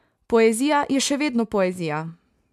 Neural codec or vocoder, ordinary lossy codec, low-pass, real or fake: none; MP3, 96 kbps; 14.4 kHz; real